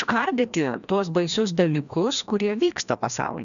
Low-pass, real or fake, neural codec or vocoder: 7.2 kHz; fake; codec, 16 kHz, 1 kbps, FreqCodec, larger model